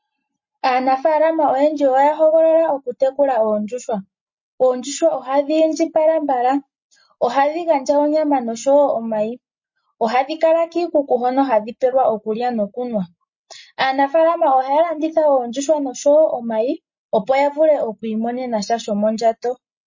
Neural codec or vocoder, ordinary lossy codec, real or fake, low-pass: none; MP3, 32 kbps; real; 7.2 kHz